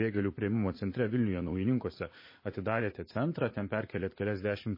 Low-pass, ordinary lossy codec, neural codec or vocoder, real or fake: 5.4 kHz; MP3, 24 kbps; vocoder, 44.1 kHz, 80 mel bands, Vocos; fake